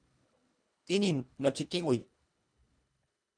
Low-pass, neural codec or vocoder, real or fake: 9.9 kHz; codec, 24 kHz, 1.5 kbps, HILCodec; fake